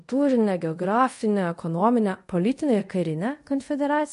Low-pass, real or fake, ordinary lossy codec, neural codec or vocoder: 10.8 kHz; fake; MP3, 48 kbps; codec, 24 kHz, 0.5 kbps, DualCodec